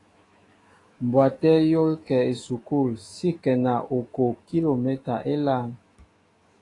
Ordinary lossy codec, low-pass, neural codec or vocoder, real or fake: AAC, 32 kbps; 10.8 kHz; autoencoder, 48 kHz, 128 numbers a frame, DAC-VAE, trained on Japanese speech; fake